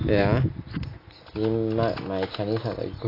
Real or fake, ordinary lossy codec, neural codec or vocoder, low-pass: real; AAC, 24 kbps; none; 5.4 kHz